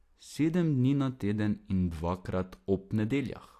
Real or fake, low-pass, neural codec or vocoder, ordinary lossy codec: real; 14.4 kHz; none; AAC, 64 kbps